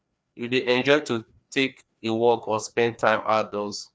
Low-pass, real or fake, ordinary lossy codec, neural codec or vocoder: none; fake; none; codec, 16 kHz, 2 kbps, FreqCodec, larger model